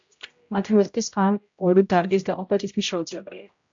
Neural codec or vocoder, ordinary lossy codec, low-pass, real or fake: codec, 16 kHz, 0.5 kbps, X-Codec, HuBERT features, trained on general audio; AAC, 64 kbps; 7.2 kHz; fake